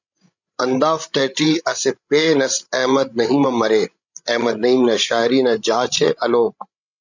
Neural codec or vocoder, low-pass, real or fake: codec, 16 kHz, 16 kbps, FreqCodec, larger model; 7.2 kHz; fake